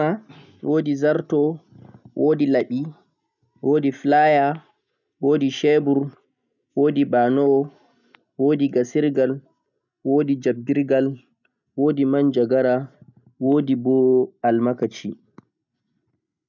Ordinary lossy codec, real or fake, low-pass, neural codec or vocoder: none; real; 7.2 kHz; none